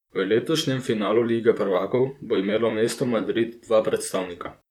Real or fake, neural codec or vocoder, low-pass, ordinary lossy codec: fake; vocoder, 44.1 kHz, 128 mel bands, Pupu-Vocoder; 19.8 kHz; none